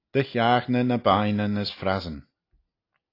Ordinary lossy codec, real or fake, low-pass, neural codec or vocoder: AAC, 32 kbps; real; 5.4 kHz; none